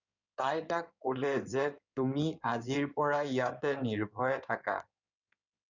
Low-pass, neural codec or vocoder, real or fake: 7.2 kHz; codec, 16 kHz in and 24 kHz out, 2.2 kbps, FireRedTTS-2 codec; fake